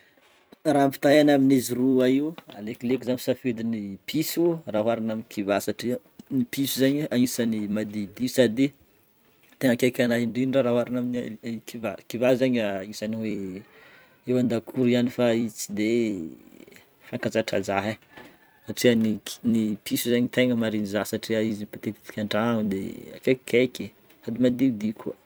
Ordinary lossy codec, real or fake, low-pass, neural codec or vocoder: none; fake; none; vocoder, 44.1 kHz, 128 mel bands every 256 samples, BigVGAN v2